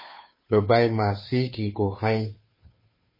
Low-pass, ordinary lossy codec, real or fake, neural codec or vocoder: 5.4 kHz; MP3, 24 kbps; fake; codec, 16 kHz, 2 kbps, FunCodec, trained on Chinese and English, 25 frames a second